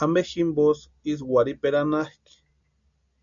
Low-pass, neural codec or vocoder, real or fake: 7.2 kHz; none; real